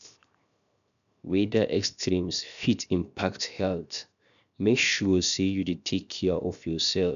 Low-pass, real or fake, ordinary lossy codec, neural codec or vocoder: 7.2 kHz; fake; none; codec, 16 kHz, 0.7 kbps, FocalCodec